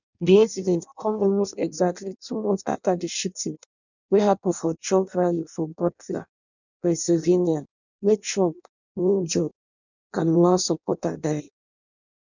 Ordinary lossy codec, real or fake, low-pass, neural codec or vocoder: none; fake; 7.2 kHz; codec, 16 kHz in and 24 kHz out, 0.6 kbps, FireRedTTS-2 codec